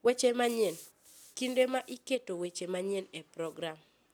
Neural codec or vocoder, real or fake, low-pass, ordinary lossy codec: none; real; none; none